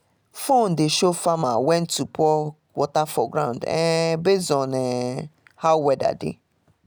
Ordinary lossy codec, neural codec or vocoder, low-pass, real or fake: none; none; none; real